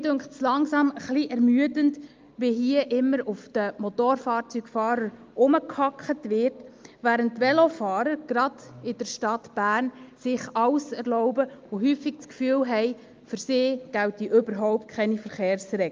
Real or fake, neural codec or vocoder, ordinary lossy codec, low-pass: real; none; Opus, 24 kbps; 7.2 kHz